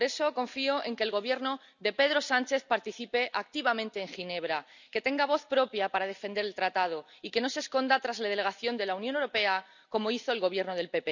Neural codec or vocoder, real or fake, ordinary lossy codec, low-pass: none; real; none; 7.2 kHz